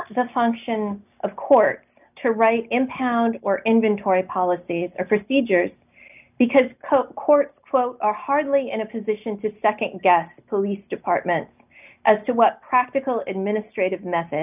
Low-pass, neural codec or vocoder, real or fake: 3.6 kHz; none; real